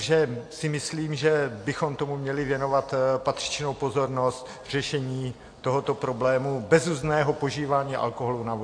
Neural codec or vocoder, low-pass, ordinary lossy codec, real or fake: none; 9.9 kHz; AAC, 48 kbps; real